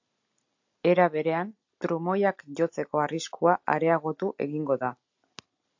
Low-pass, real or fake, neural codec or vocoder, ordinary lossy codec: 7.2 kHz; real; none; AAC, 48 kbps